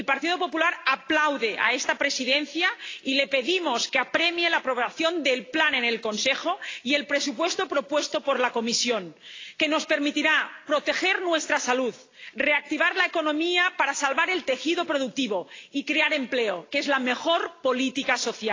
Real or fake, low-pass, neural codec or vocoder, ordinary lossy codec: real; 7.2 kHz; none; AAC, 32 kbps